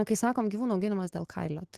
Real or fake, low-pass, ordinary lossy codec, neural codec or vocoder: fake; 14.4 kHz; Opus, 16 kbps; autoencoder, 48 kHz, 128 numbers a frame, DAC-VAE, trained on Japanese speech